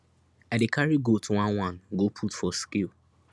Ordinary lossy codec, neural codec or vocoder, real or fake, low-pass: none; none; real; none